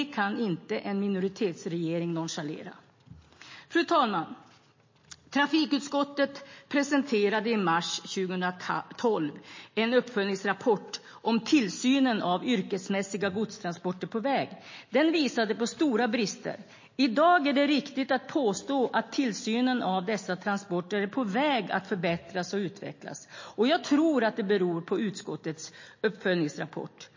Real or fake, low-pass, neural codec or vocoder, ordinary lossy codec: real; 7.2 kHz; none; MP3, 32 kbps